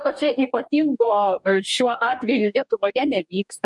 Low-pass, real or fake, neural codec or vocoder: 10.8 kHz; fake; codec, 44.1 kHz, 2.6 kbps, DAC